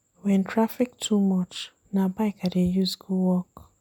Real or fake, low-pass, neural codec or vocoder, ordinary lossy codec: real; 19.8 kHz; none; none